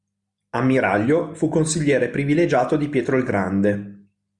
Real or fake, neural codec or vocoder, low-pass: real; none; 10.8 kHz